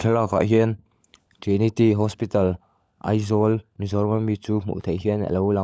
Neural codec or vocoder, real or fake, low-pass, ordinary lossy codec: codec, 16 kHz, 16 kbps, FunCodec, trained on LibriTTS, 50 frames a second; fake; none; none